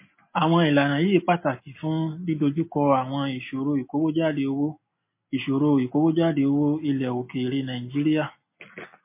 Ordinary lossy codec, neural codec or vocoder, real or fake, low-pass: MP3, 24 kbps; none; real; 3.6 kHz